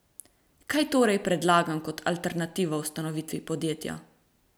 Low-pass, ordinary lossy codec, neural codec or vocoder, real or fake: none; none; none; real